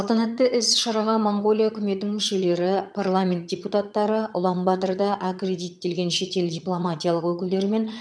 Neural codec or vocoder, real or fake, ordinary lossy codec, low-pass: vocoder, 22.05 kHz, 80 mel bands, HiFi-GAN; fake; none; none